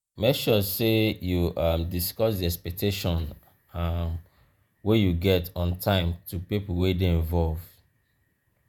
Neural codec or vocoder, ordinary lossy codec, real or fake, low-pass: vocoder, 48 kHz, 128 mel bands, Vocos; none; fake; none